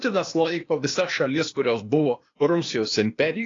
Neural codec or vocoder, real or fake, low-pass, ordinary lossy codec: codec, 16 kHz, 0.8 kbps, ZipCodec; fake; 7.2 kHz; AAC, 32 kbps